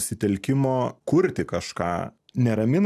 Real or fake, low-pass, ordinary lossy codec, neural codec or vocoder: real; 14.4 kHz; AAC, 96 kbps; none